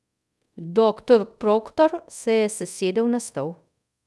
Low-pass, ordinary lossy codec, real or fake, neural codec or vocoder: none; none; fake; codec, 24 kHz, 0.5 kbps, DualCodec